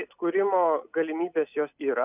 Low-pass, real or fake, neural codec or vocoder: 3.6 kHz; real; none